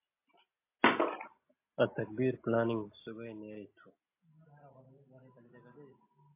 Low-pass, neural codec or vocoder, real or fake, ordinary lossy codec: 3.6 kHz; none; real; MP3, 24 kbps